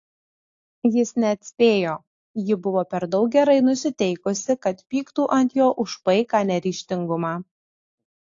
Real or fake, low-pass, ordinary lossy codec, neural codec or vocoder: real; 7.2 kHz; AAC, 48 kbps; none